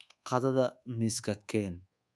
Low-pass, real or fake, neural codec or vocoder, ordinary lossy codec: none; fake; codec, 24 kHz, 1.2 kbps, DualCodec; none